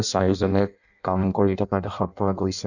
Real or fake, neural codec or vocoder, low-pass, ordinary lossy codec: fake; codec, 16 kHz in and 24 kHz out, 0.6 kbps, FireRedTTS-2 codec; 7.2 kHz; none